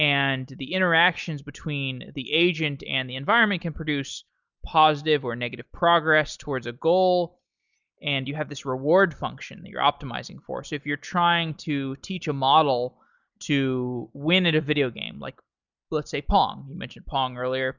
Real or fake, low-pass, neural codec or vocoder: real; 7.2 kHz; none